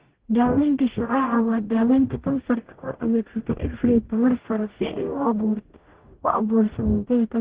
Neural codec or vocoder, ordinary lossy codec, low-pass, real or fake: codec, 44.1 kHz, 0.9 kbps, DAC; Opus, 16 kbps; 3.6 kHz; fake